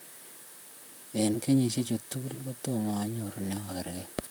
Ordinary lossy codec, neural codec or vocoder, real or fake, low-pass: none; vocoder, 44.1 kHz, 128 mel bands, Pupu-Vocoder; fake; none